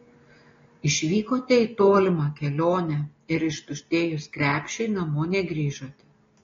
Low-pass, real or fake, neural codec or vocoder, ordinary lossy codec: 7.2 kHz; real; none; AAC, 32 kbps